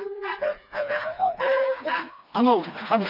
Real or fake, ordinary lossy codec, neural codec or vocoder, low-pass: fake; none; codec, 16 kHz, 2 kbps, FreqCodec, smaller model; 5.4 kHz